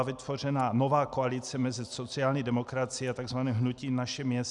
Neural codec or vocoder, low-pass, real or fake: none; 10.8 kHz; real